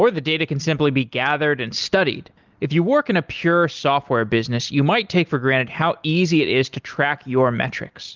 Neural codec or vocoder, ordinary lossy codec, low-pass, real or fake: none; Opus, 32 kbps; 7.2 kHz; real